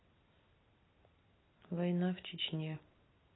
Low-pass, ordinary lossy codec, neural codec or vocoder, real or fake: 7.2 kHz; AAC, 16 kbps; none; real